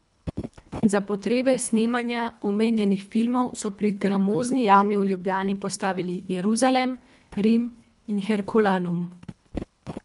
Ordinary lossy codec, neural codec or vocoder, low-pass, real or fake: none; codec, 24 kHz, 1.5 kbps, HILCodec; 10.8 kHz; fake